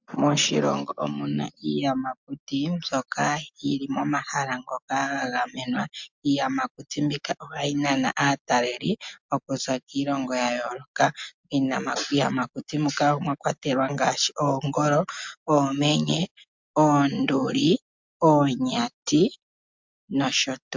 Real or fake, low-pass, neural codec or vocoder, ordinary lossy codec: real; 7.2 kHz; none; MP3, 64 kbps